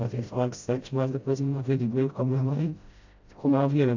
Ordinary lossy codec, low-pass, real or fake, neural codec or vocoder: AAC, 48 kbps; 7.2 kHz; fake; codec, 16 kHz, 0.5 kbps, FreqCodec, smaller model